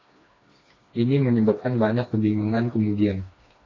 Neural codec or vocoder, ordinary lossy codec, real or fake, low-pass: codec, 16 kHz, 2 kbps, FreqCodec, smaller model; AAC, 32 kbps; fake; 7.2 kHz